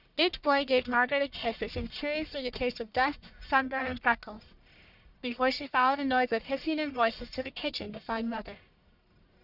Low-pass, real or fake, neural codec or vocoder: 5.4 kHz; fake; codec, 44.1 kHz, 1.7 kbps, Pupu-Codec